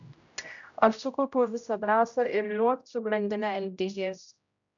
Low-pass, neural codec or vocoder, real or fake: 7.2 kHz; codec, 16 kHz, 0.5 kbps, X-Codec, HuBERT features, trained on general audio; fake